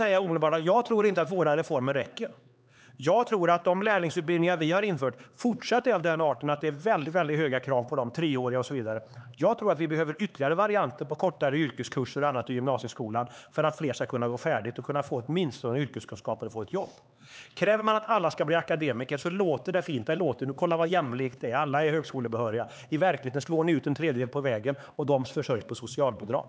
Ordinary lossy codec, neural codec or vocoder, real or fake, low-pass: none; codec, 16 kHz, 4 kbps, X-Codec, HuBERT features, trained on LibriSpeech; fake; none